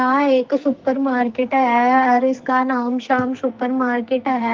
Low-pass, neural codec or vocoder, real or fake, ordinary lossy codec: 7.2 kHz; codec, 44.1 kHz, 2.6 kbps, SNAC; fake; Opus, 32 kbps